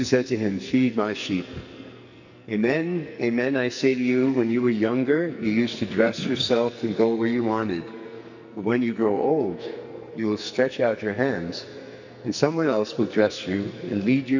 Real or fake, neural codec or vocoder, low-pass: fake; codec, 44.1 kHz, 2.6 kbps, SNAC; 7.2 kHz